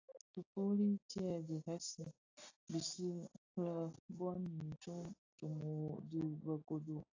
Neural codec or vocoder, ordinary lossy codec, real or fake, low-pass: none; AAC, 32 kbps; real; 7.2 kHz